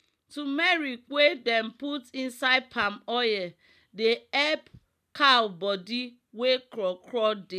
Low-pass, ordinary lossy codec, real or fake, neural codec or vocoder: 14.4 kHz; none; real; none